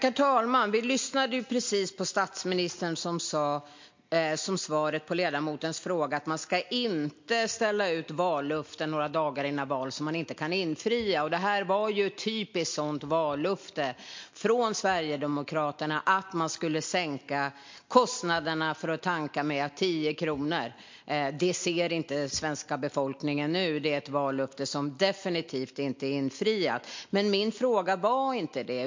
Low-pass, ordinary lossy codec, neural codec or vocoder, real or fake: 7.2 kHz; MP3, 48 kbps; none; real